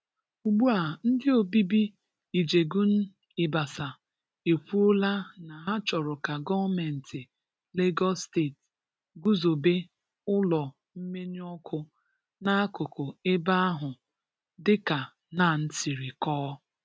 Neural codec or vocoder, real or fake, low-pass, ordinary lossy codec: none; real; none; none